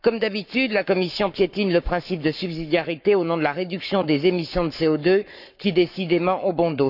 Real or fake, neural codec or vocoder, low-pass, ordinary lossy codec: fake; codec, 44.1 kHz, 7.8 kbps, Pupu-Codec; 5.4 kHz; none